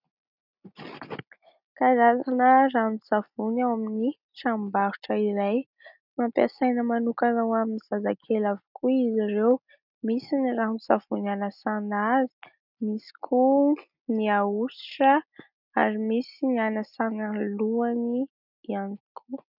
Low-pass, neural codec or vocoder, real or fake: 5.4 kHz; none; real